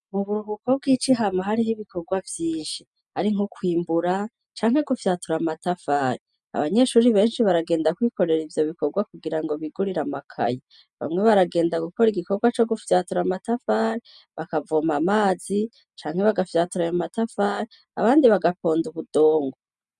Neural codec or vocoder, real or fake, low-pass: none; real; 10.8 kHz